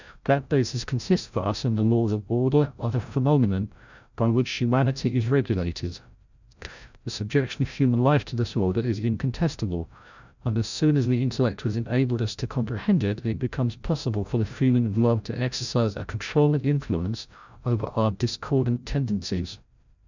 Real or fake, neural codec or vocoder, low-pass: fake; codec, 16 kHz, 0.5 kbps, FreqCodec, larger model; 7.2 kHz